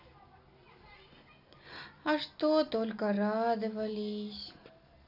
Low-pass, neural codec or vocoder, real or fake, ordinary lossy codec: 5.4 kHz; none; real; none